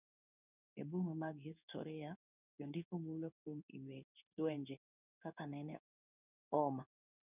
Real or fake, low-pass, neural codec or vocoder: fake; 3.6 kHz; codec, 16 kHz in and 24 kHz out, 1 kbps, XY-Tokenizer